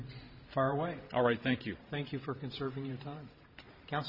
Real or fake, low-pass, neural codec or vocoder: fake; 5.4 kHz; vocoder, 44.1 kHz, 128 mel bands every 512 samples, BigVGAN v2